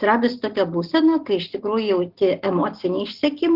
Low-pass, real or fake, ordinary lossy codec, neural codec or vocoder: 5.4 kHz; real; Opus, 16 kbps; none